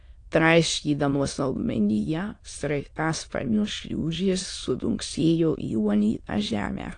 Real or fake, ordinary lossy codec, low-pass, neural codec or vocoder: fake; AAC, 48 kbps; 9.9 kHz; autoencoder, 22.05 kHz, a latent of 192 numbers a frame, VITS, trained on many speakers